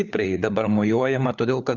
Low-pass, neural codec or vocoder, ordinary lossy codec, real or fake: 7.2 kHz; codec, 16 kHz, 4 kbps, FunCodec, trained on LibriTTS, 50 frames a second; Opus, 64 kbps; fake